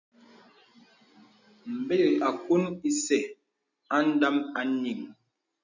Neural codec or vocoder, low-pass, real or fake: none; 7.2 kHz; real